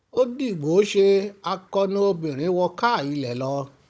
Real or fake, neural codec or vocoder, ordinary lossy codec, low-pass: fake; codec, 16 kHz, 16 kbps, FunCodec, trained on Chinese and English, 50 frames a second; none; none